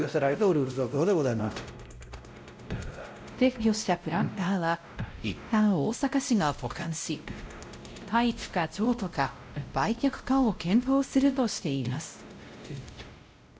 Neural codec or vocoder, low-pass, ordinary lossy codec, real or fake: codec, 16 kHz, 0.5 kbps, X-Codec, WavLM features, trained on Multilingual LibriSpeech; none; none; fake